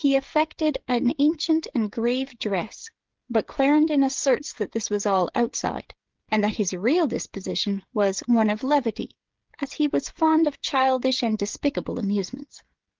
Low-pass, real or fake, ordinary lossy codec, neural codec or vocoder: 7.2 kHz; fake; Opus, 16 kbps; codec, 16 kHz, 16 kbps, FreqCodec, smaller model